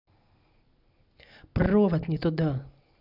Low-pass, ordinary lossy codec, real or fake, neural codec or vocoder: 5.4 kHz; none; real; none